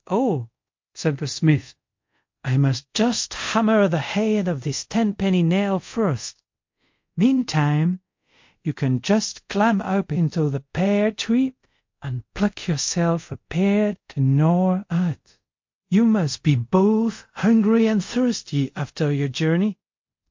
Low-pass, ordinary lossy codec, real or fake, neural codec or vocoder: 7.2 kHz; MP3, 48 kbps; fake; codec, 24 kHz, 0.5 kbps, DualCodec